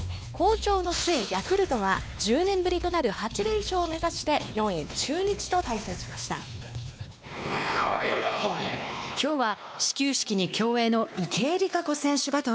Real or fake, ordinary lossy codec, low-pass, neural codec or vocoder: fake; none; none; codec, 16 kHz, 2 kbps, X-Codec, WavLM features, trained on Multilingual LibriSpeech